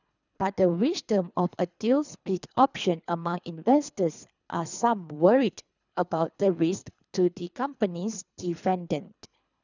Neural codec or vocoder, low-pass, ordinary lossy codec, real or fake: codec, 24 kHz, 3 kbps, HILCodec; 7.2 kHz; none; fake